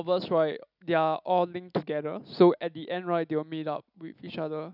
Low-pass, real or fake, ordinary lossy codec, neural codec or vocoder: 5.4 kHz; real; none; none